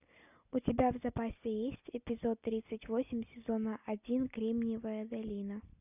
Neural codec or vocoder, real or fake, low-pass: none; real; 3.6 kHz